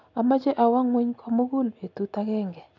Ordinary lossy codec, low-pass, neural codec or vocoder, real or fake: none; 7.2 kHz; none; real